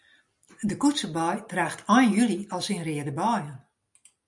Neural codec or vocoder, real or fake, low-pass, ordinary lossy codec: none; real; 10.8 kHz; MP3, 96 kbps